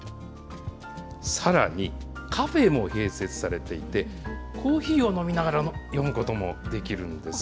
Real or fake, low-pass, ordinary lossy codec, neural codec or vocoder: real; none; none; none